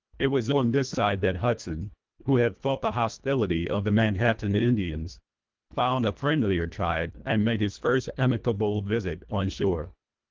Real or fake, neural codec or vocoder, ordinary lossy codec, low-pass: fake; codec, 24 kHz, 1.5 kbps, HILCodec; Opus, 32 kbps; 7.2 kHz